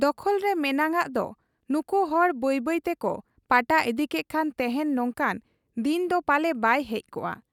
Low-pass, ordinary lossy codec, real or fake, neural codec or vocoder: 19.8 kHz; none; real; none